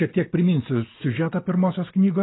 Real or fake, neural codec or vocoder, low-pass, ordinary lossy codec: real; none; 7.2 kHz; AAC, 16 kbps